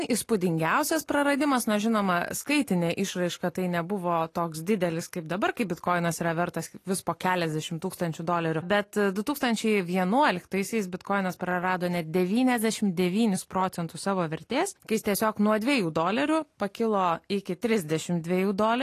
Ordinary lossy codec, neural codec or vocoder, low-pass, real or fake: AAC, 48 kbps; none; 14.4 kHz; real